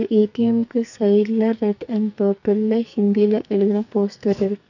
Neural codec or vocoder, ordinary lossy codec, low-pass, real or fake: codec, 44.1 kHz, 2.6 kbps, SNAC; none; 7.2 kHz; fake